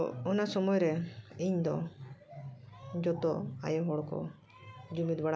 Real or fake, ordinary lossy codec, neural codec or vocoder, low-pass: real; none; none; none